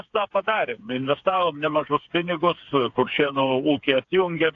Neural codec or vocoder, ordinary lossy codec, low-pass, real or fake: codec, 16 kHz, 4 kbps, FreqCodec, smaller model; AAC, 48 kbps; 7.2 kHz; fake